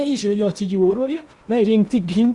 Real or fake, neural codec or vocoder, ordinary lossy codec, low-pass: fake; codec, 16 kHz in and 24 kHz out, 0.8 kbps, FocalCodec, streaming, 65536 codes; Opus, 64 kbps; 10.8 kHz